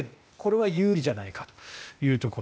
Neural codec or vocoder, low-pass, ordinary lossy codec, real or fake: codec, 16 kHz, 0.8 kbps, ZipCodec; none; none; fake